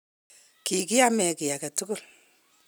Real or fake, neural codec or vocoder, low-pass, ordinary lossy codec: real; none; none; none